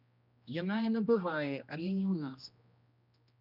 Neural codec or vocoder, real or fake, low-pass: codec, 16 kHz, 1 kbps, X-Codec, HuBERT features, trained on general audio; fake; 5.4 kHz